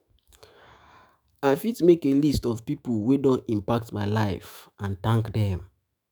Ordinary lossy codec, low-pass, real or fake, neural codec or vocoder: none; none; fake; autoencoder, 48 kHz, 128 numbers a frame, DAC-VAE, trained on Japanese speech